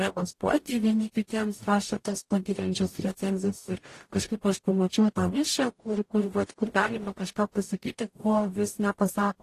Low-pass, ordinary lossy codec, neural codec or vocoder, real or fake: 14.4 kHz; AAC, 48 kbps; codec, 44.1 kHz, 0.9 kbps, DAC; fake